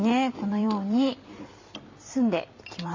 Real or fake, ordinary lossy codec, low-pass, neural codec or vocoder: fake; MP3, 32 kbps; 7.2 kHz; vocoder, 44.1 kHz, 128 mel bands every 256 samples, BigVGAN v2